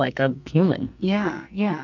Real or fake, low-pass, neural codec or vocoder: fake; 7.2 kHz; codec, 44.1 kHz, 2.6 kbps, SNAC